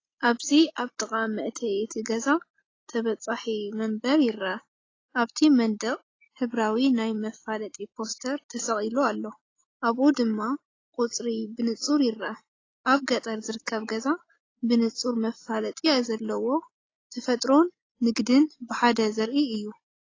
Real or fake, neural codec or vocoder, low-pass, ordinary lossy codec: real; none; 7.2 kHz; AAC, 32 kbps